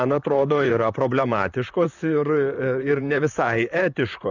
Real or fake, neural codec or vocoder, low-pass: fake; vocoder, 44.1 kHz, 128 mel bands, Pupu-Vocoder; 7.2 kHz